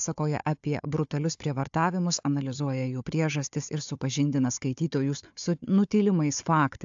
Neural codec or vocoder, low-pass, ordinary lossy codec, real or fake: codec, 16 kHz, 4 kbps, FunCodec, trained on Chinese and English, 50 frames a second; 7.2 kHz; AAC, 64 kbps; fake